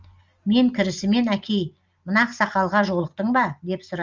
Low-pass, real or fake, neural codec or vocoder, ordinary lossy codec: 7.2 kHz; real; none; Opus, 32 kbps